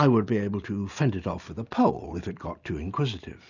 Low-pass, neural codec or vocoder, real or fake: 7.2 kHz; none; real